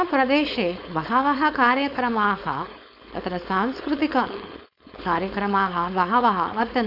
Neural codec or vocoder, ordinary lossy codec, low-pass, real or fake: codec, 16 kHz, 4.8 kbps, FACodec; none; 5.4 kHz; fake